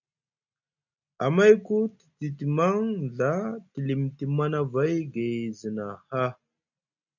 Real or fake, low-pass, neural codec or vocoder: real; 7.2 kHz; none